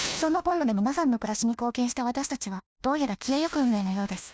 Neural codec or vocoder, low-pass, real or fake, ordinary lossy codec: codec, 16 kHz, 1 kbps, FunCodec, trained on LibriTTS, 50 frames a second; none; fake; none